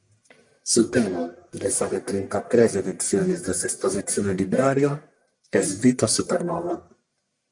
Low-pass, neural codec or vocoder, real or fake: 10.8 kHz; codec, 44.1 kHz, 1.7 kbps, Pupu-Codec; fake